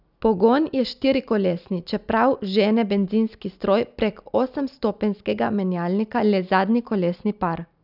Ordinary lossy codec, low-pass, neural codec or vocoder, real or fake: none; 5.4 kHz; none; real